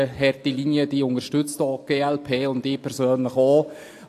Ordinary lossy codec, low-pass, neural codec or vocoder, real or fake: AAC, 64 kbps; 14.4 kHz; none; real